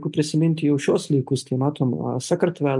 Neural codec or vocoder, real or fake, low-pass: none; real; 10.8 kHz